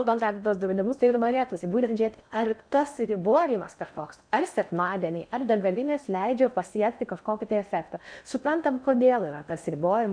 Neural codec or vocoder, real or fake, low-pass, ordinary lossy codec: codec, 16 kHz in and 24 kHz out, 0.6 kbps, FocalCodec, streaming, 4096 codes; fake; 9.9 kHz; MP3, 96 kbps